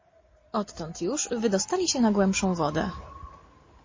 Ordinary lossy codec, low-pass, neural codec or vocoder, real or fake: MP3, 32 kbps; 7.2 kHz; none; real